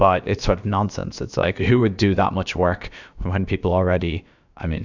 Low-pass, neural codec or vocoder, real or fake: 7.2 kHz; codec, 16 kHz, about 1 kbps, DyCAST, with the encoder's durations; fake